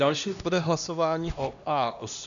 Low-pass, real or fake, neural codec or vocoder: 7.2 kHz; fake; codec, 16 kHz, 1 kbps, X-Codec, HuBERT features, trained on LibriSpeech